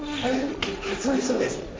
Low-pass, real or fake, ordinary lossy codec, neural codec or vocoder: none; fake; none; codec, 16 kHz, 1.1 kbps, Voila-Tokenizer